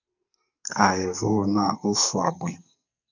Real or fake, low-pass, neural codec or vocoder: fake; 7.2 kHz; codec, 44.1 kHz, 2.6 kbps, SNAC